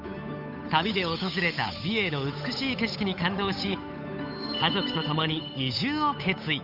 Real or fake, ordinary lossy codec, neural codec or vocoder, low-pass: fake; none; codec, 16 kHz, 8 kbps, FunCodec, trained on Chinese and English, 25 frames a second; 5.4 kHz